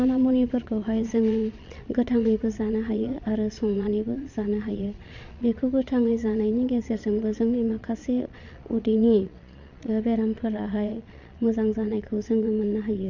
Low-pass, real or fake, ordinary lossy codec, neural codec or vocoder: 7.2 kHz; fake; Opus, 64 kbps; vocoder, 22.05 kHz, 80 mel bands, Vocos